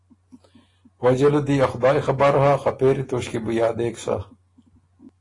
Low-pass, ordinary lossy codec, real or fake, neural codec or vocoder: 10.8 kHz; AAC, 32 kbps; real; none